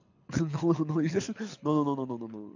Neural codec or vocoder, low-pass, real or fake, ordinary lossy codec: codec, 24 kHz, 6 kbps, HILCodec; 7.2 kHz; fake; AAC, 48 kbps